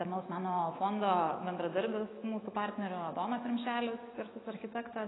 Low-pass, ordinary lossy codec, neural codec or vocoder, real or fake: 7.2 kHz; AAC, 16 kbps; autoencoder, 48 kHz, 128 numbers a frame, DAC-VAE, trained on Japanese speech; fake